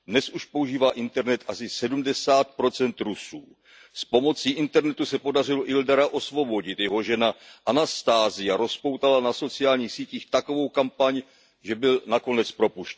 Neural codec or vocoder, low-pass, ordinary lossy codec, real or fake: none; none; none; real